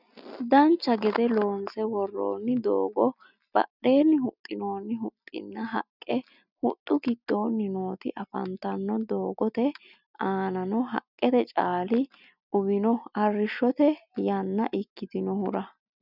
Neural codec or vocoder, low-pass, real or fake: none; 5.4 kHz; real